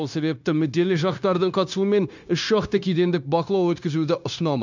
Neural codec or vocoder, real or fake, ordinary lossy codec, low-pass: codec, 16 kHz, 0.9 kbps, LongCat-Audio-Codec; fake; none; 7.2 kHz